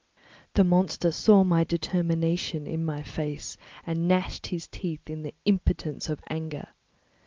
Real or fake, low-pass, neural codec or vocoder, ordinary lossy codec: real; 7.2 kHz; none; Opus, 32 kbps